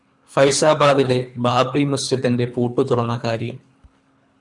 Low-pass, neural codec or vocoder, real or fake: 10.8 kHz; codec, 24 kHz, 3 kbps, HILCodec; fake